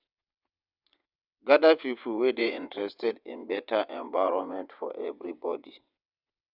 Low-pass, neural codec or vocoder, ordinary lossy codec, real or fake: 5.4 kHz; vocoder, 22.05 kHz, 80 mel bands, WaveNeXt; none; fake